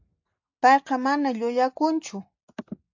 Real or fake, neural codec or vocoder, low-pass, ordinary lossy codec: real; none; 7.2 kHz; AAC, 48 kbps